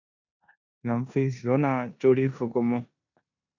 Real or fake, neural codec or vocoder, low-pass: fake; codec, 16 kHz in and 24 kHz out, 0.9 kbps, LongCat-Audio-Codec, four codebook decoder; 7.2 kHz